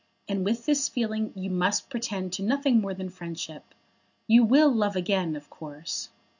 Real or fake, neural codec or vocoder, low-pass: real; none; 7.2 kHz